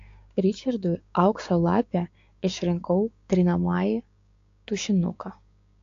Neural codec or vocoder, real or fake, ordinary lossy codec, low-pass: codec, 16 kHz, 6 kbps, DAC; fake; AAC, 48 kbps; 7.2 kHz